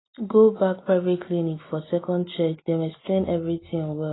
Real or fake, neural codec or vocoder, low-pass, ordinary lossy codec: real; none; 7.2 kHz; AAC, 16 kbps